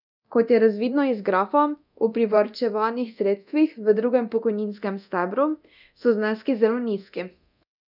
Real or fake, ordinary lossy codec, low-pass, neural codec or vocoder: fake; none; 5.4 kHz; codec, 24 kHz, 0.9 kbps, DualCodec